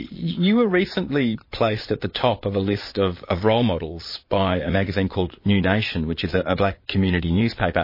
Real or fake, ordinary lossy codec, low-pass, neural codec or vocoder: fake; MP3, 24 kbps; 5.4 kHz; vocoder, 22.05 kHz, 80 mel bands, Vocos